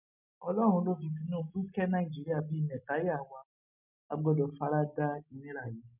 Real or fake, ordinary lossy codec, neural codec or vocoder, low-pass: real; none; none; 3.6 kHz